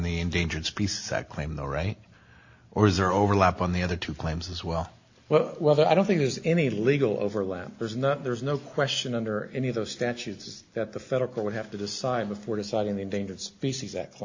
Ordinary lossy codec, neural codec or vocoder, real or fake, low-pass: AAC, 48 kbps; none; real; 7.2 kHz